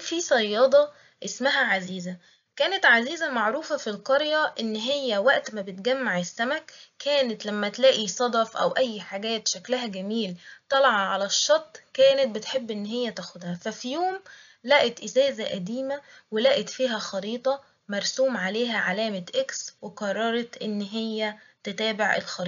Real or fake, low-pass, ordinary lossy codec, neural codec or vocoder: real; 7.2 kHz; none; none